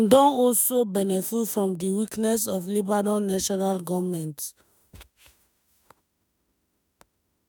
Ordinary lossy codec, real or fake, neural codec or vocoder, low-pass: none; fake; autoencoder, 48 kHz, 32 numbers a frame, DAC-VAE, trained on Japanese speech; none